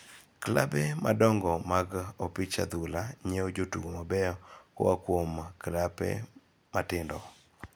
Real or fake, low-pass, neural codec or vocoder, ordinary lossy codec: real; none; none; none